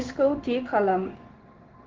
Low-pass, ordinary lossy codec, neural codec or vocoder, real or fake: 7.2 kHz; Opus, 16 kbps; codec, 16 kHz in and 24 kHz out, 1 kbps, XY-Tokenizer; fake